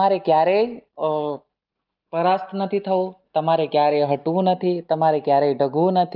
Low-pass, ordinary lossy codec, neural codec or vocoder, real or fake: 5.4 kHz; Opus, 24 kbps; none; real